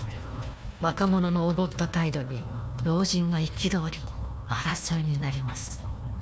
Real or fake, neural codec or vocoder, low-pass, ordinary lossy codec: fake; codec, 16 kHz, 1 kbps, FunCodec, trained on Chinese and English, 50 frames a second; none; none